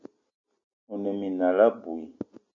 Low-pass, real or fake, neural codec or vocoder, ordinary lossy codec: 7.2 kHz; real; none; MP3, 64 kbps